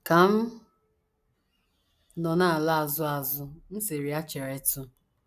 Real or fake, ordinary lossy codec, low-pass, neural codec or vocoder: real; AAC, 96 kbps; 14.4 kHz; none